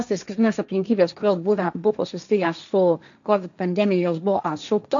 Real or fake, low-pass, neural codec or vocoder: fake; 7.2 kHz; codec, 16 kHz, 1.1 kbps, Voila-Tokenizer